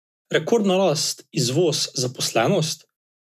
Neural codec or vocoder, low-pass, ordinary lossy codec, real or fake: none; 14.4 kHz; none; real